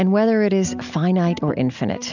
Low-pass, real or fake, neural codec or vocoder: 7.2 kHz; real; none